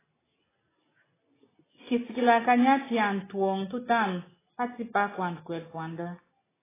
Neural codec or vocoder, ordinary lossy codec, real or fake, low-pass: none; AAC, 16 kbps; real; 3.6 kHz